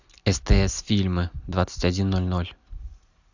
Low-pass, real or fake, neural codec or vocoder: 7.2 kHz; real; none